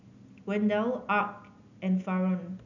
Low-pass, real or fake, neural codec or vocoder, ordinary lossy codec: 7.2 kHz; real; none; none